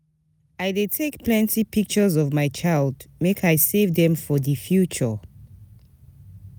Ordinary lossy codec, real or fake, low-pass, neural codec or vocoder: none; real; none; none